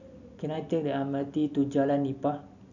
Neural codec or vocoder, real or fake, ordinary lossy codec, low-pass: none; real; none; 7.2 kHz